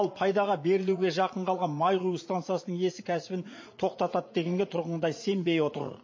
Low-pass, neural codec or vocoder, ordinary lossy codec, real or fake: 7.2 kHz; vocoder, 44.1 kHz, 80 mel bands, Vocos; MP3, 32 kbps; fake